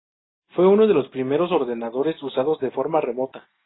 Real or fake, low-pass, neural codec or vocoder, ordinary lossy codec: real; 7.2 kHz; none; AAC, 16 kbps